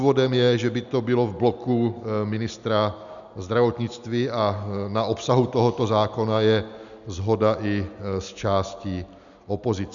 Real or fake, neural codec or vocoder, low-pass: real; none; 7.2 kHz